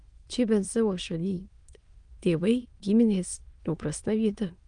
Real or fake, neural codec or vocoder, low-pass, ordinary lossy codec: fake; autoencoder, 22.05 kHz, a latent of 192 numbers a frame, VITS, trained on many speakers; 9.9 kHz; Opus, 32 kbps